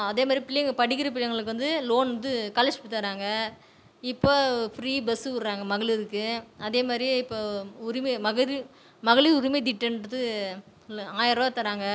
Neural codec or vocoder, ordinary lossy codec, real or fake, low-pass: none; none; real; none